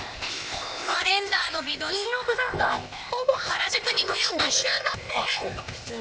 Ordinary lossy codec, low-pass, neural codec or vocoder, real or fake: none; none; codec, 16 kHz, 0.8 kbps, ZipCodec; fake